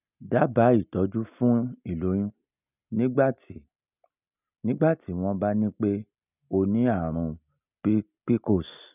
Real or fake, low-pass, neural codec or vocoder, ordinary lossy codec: real; 3.6 kHz; none; none